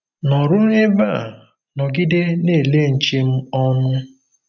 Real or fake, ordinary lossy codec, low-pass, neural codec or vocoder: real; none; 7.2 kHz; none